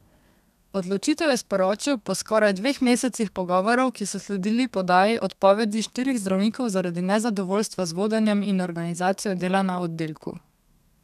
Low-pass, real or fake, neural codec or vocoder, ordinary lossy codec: 14.4 kHz; fake; codec, 32 kHz, 1.9 kbps, SNAC; none